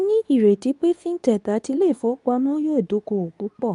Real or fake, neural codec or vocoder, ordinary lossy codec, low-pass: fake; codec, 24 kHz, 0.9 kbps, WavTokenizer, medium speech release version 2; none; 10.8 kHz